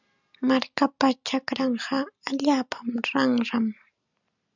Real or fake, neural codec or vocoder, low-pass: real; none; 7.2 kHz